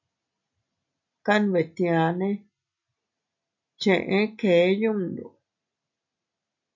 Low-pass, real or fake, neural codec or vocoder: 7.2 kHz; real; none